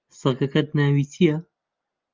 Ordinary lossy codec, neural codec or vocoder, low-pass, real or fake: Opus, 24 kbps; none; 7.2 kHz; real